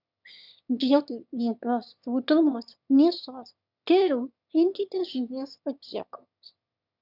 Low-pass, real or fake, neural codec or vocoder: 5.4 kHz; fake; autoencoder, 22.05 kHz, a latent of 192 numbers a frame, VITS, trained on one speaker